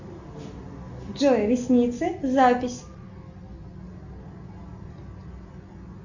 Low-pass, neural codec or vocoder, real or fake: 7.2 kHz; none; real